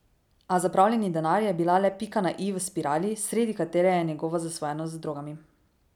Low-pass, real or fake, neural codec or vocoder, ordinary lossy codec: 19.8 kHz; real; none; none